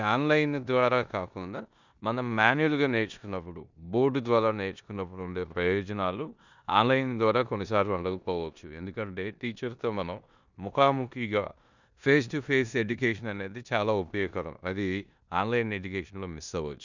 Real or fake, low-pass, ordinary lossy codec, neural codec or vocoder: fake; 7.2 kHz; none; codec, 16 kHz in and 24 kHz out, 0.9 kbps, LongCat-Audio-Codec, four codebook decoder